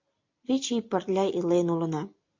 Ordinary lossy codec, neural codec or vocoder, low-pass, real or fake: MP3, 48 kbps; none; 7.2 kHz; real